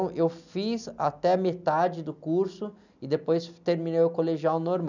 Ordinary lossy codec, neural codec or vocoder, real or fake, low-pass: none; none; real; 7.2 kHz